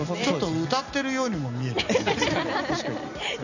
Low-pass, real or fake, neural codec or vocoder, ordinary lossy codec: 7.2 kHz; real; none; none